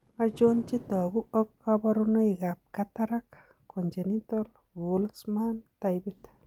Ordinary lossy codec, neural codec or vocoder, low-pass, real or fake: Opus, 32 kbps; none; 19.8 kHz; real